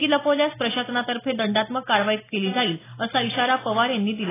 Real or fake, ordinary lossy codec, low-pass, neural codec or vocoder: real; AAC, 16 kbps; 3.6 kHz; none